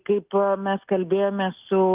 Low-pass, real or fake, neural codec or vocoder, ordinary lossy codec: 3.6 kHz; real; none; Opus, 32 kbps